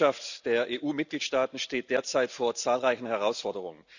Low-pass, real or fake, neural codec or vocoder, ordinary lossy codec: 7.2 kHz; real; none; none